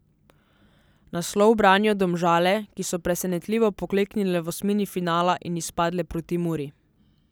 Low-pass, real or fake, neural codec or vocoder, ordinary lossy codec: none; real; none; none